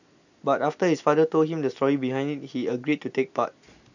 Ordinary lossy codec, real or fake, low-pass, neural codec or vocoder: none; real; 7.2 kHz; none